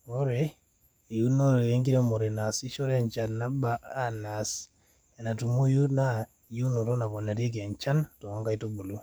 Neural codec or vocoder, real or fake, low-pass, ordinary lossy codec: codec, 44.1 kHz, 7.8 kbps, DAC; fake; none; none